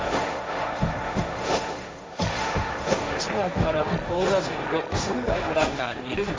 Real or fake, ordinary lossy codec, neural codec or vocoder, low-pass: fake; none; codec, 16 kHz, 1.1 kbps, Voila-Tokenizer; none